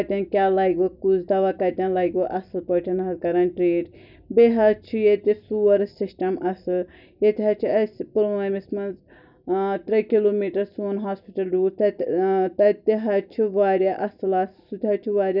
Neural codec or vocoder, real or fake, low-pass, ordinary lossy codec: none; real; 5.4 kHz; none